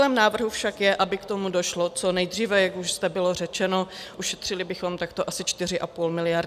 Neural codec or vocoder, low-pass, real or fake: none; 14.4 kHz; real